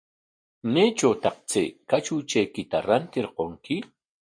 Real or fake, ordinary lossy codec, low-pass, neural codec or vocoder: real; MP3, 48 kbps; 9.9 kHz; none